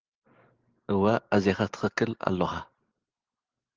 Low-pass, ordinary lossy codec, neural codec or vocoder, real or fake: 7.2 kHz; Opus, 16 kbps; none; real